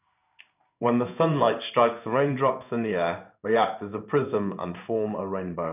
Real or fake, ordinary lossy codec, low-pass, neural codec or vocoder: fake; none; 3.6 kHz; codec, 16 kHz in and 24 kHz out, 1 kbps, XY-Tokenizer